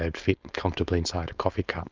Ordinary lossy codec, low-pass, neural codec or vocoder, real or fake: Opus, 24 kbps; 7.2 kHz; codec, 16 kHz, 4.8 kbps, FACodec; fake